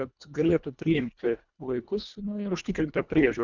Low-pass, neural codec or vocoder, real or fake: 7.2 kHz; codec, 24 kHz, 1.5 kbps, HILCodec; fake